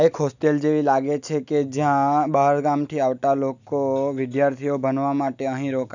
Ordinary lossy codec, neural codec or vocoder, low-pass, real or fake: none; none; 7.2 kHz; real